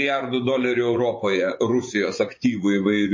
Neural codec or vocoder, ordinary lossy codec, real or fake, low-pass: vocoder, 24 kHz, 100 mel bands, Vocos; MP3, 32 kbps; fake; 7.2 kHz